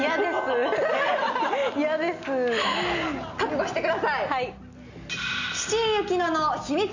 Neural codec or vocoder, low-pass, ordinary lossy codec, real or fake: none; 7.2 kHz; Opus, 64 kbps; real